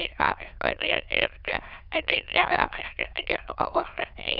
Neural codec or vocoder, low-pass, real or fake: autoencoder, 22.05 kHz, a latent of 192 numbers a frame, VITS, trained on many speakers; 5.4 kHz; fake